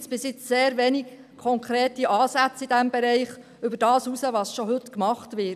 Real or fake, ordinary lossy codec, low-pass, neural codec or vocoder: real; none; 14.4 kHz; none